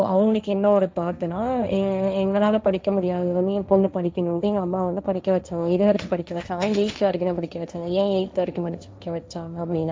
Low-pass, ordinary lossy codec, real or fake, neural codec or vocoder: none; none; fake; codec, 16 kHz, 1.1 kbps, Voila-Tokenizer